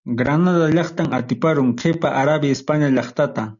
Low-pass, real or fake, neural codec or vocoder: 7.2 kHz; real; none